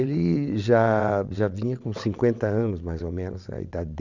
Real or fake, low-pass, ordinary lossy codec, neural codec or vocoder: fake; 7.2 kHz; none; vocoder, 22.05 kHz, 80 mel bands, WaveNeXt